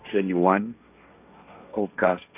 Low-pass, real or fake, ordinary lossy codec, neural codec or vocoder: 3.6 kHz; fake; none; codec, 16 kHz, 1.1 kbps, Voila-Tokenizer